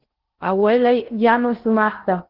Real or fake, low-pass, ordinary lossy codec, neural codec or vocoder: fake; 5.4 kHz; Opus, 16 kbps; codec, 16 kHz in and 24 kHz out, 0.6 kbps, FocalCodec, streaming, 2048 codes